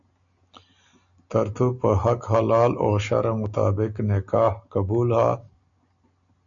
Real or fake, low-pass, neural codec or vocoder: real; 7.2 kHz; none